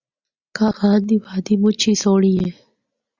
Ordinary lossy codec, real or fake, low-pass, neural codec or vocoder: Opus, 64 kbps; real; 7.2 kHz; none